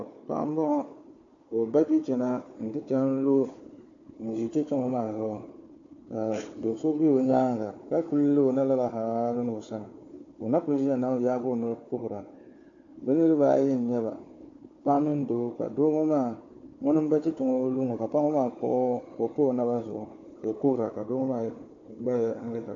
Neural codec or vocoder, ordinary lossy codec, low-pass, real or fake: codec, 16 kHz, 4 kbps, FunCodec, trained on Chinese and English, 50 frames a second; AAC, 48 kbps; 7.2 kHz; fake